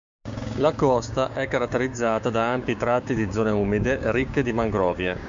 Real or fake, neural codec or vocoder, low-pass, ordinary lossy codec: fake; codec, 16 kHz, 6 kbps, DAC; 7.2 kHz; MP3, 64 kbps